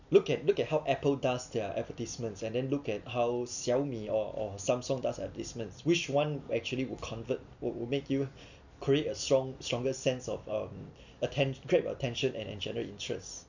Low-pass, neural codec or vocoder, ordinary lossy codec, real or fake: 7.2 kHz; none; none; real